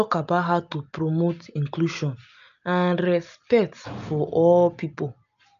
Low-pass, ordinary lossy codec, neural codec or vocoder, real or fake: 7.2 kHz; AAC, 96 kbps; none; real